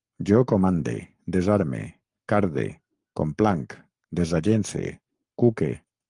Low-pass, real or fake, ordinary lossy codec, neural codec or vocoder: 10.8 kHz; fake; Opus, 32 kbps; codec, 44.1 kHz, 7.8 kbps, Pupu-Codec